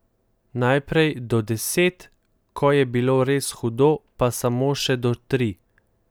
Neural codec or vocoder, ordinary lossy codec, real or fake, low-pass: none; none; real; none